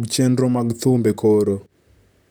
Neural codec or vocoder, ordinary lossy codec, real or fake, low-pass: vocoder, 44.1 kHz, 128 mel bands, Pupu-Vocoder; none; fake; none